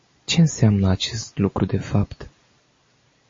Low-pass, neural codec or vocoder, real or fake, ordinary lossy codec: 7.2 kHz; none; real; MP3, 32 kbps